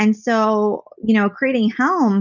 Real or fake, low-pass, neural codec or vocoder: real; 7.2 kHz; none